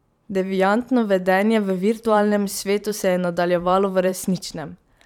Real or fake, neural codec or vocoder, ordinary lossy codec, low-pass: fake; vocoder, 44.1 kHz, 128 mel bands every 512 samples, BigVGAN v2; none; 19.8 kHz